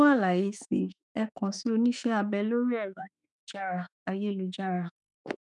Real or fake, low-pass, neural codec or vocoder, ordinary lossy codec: fake; 10.8 kHz; autoencoder, 48 kHz, 32 numbers a frame, DAC-VAE, trained on Japanese speech; none